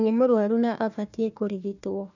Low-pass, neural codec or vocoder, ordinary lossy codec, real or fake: 7.2 kHz; codec, 16 kHz, 1 kbps, FunCodec, trained on Chinese and English, 50 frames a second; none; fake